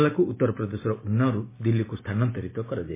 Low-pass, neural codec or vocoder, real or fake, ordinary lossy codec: 3.6 kHz; none; real; MP3, 16 kbps